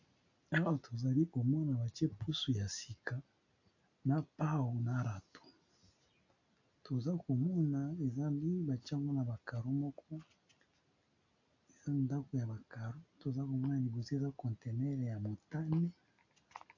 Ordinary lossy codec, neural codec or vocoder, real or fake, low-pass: AAC, 48 kbps; none; real; 7.2 kHz